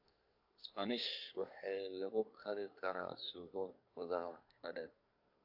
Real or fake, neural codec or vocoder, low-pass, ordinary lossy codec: fake; codec, 24 kHz, 1 kbps, SNAC; 5.4 kHz; AAC, 48 kbps